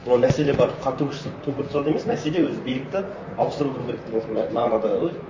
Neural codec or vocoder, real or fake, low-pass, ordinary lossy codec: vocoder, 44.1 kHz, 128 mel bands, Pupu-Vocoder; fake; 7.2 kHz; MP3, 32 kbps